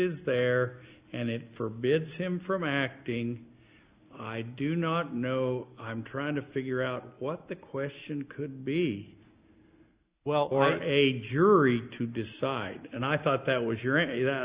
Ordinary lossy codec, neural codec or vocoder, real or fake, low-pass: Opus, 64 kbps; none; real; 3.6 kHz